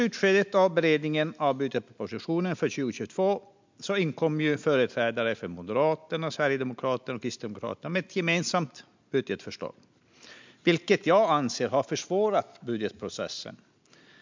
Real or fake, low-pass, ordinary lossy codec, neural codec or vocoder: real; 7.2 kHz; MP3, 64 kbps; none